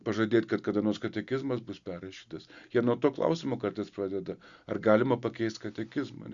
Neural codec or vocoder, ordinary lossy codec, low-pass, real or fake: none; Opus, 64 kbps; 7.2 kHz; real